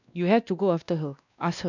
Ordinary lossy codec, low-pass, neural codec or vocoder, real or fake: none; 7.2 kHz; codec, 16 kHz, 1 kbps, X-Codec, WavLM features, trained on Multilingual LibriSpeech; fake